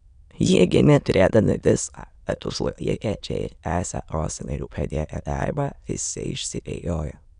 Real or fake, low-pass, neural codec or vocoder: fake; 9.9 kHz; autoencoder, 22.05 kHz, a latent of 192 numbers a frame, VITS, trained on many speakers